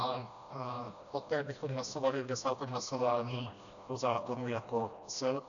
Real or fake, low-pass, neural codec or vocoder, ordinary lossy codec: fake; 7.2 kHz; codec, 16 kHz, 1 kbps, FreqCodec, smaller model; MP3, 96 kbps